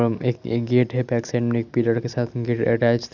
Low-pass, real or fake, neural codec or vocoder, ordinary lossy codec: 7.2 kHz; real; none; none